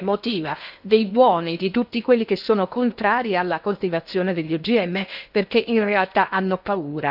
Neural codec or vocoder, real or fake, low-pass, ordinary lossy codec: codec, 16 kHz in and 24 kHz out, 0.8 kbps, FocalCodec, streaming, 65536 codes; fake; 5.4 kHz; none